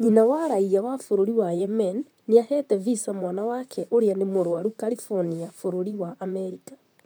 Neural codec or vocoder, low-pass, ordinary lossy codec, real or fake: vocoder, 44.1 kHz, 128 mel bands, Pupu-Vocoder; none; none; fake